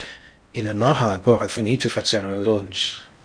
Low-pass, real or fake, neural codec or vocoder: 9.9 kHz; fake; codec, 16 kHz in and 24 kHz out, 0.6 kbps, FocalCodec, streaming, 4096 codes